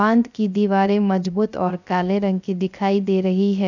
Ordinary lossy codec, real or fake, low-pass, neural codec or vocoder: none; fake; 7.2 kHz; codec, 16 kHz, 0.3 kbps, FocalCodec